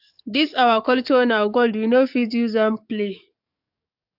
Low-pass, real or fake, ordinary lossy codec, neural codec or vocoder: 5.4 kHz; fake; AAC, 48 kbps; codec, 44.1 kHz, 7.8 kbps, DAC